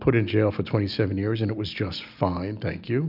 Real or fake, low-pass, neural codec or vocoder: real; 5.4 kHz; none